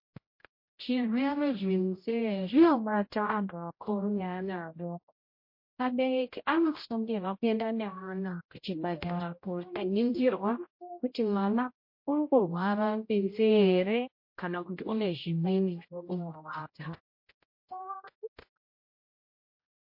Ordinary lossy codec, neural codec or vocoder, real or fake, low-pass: MP3, 32 kbps; codec, 16 kHz, 0.5 kbps, X-Codec, HuBERT features, trained on general audio; fake; 5.4 kHz